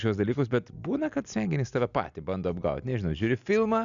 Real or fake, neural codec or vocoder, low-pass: real; none; 7.2 kHz